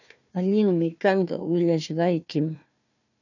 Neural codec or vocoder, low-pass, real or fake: codec, 16 kHz, 1 kbps, FunCodec, trained on Chinese and English, 50 frames a second; 7.2 kHz; fake